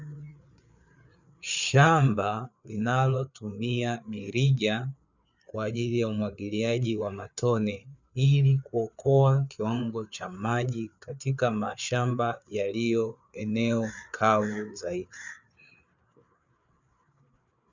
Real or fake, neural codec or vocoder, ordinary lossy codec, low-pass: fake; codec, 16 kHz, 4 kbps, FreqCodec, larger model; Opus, 64 kbps; 7.2 kHz